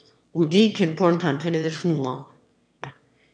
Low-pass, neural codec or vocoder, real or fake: 9.9 kHz; autoencoder, 22.05 kHz, a latent of 192 numbers a frame, VITS, trained on one speaker; fake